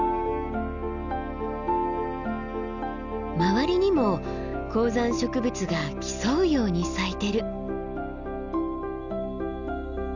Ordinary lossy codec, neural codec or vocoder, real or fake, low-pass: none; none; real; 7.2 kHz